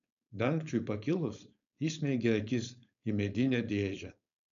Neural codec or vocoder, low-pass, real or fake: codec, 16 kHz, 4.8 kbps, FACodec; 7.2 kHz; fake